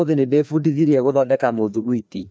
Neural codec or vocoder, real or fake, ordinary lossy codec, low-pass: codec, 16 kHz, 2 kbps, FreqCodec, larger model; fake; none; none